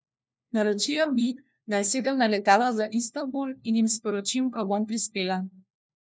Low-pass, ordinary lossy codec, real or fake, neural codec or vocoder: none; none; fake; codec, 16 kHz, 1 kbps, FunCodec, trained on LibriTTS, 50 frames a second